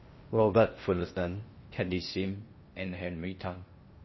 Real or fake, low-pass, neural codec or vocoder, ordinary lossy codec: fake; 7.2 kHz; codec, 16 kHz in and 24 kHz out, 0.6 kbps, FocalCodec, streaming, 4096 codes; MP3, 24 kbps